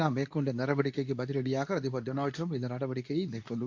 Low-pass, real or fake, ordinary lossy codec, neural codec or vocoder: 7.2 kHz; fake; AAC, 48 kbps; codec, 24 kHz, 0.9 kbps, WavTokenizer, medium speech release version 2